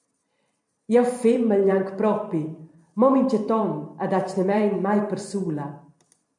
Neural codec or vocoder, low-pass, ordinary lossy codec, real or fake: vocoder, 44.1 kHz, 128 mel bands every 512 samples, BigVGAN v2; 10.8 kHz; MP3, 64 kbps; fake